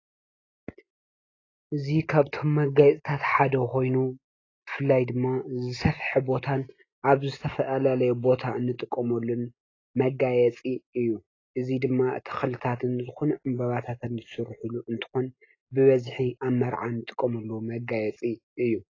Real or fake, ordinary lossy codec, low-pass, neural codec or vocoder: real; AAC, 32 kbps; 7.2 kHz; none